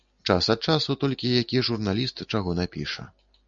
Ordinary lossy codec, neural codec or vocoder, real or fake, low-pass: MP3, 64 kbps; none; real; 7.2 kHz